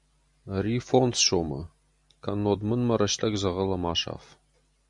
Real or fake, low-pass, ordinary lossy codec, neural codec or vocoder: real; 10.8 kHz; MP3, 64 kbps; none